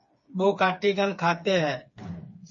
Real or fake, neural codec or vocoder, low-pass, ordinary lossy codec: fake; codec, 16 kHz, 4 kbps, FreqCodec, smaller model; 7.2 kHz; MP3, 32 kbps